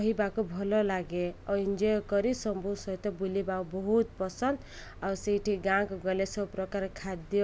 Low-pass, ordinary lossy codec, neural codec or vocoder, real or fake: none; none; none; real